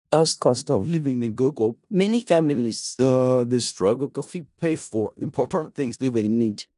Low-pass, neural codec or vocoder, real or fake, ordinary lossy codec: 10.8 kHz; codec, 16 kHz in and 24 kHz out, 0.4 kbps, LongCat-Audio-Codec, four codebook decoder; fake; none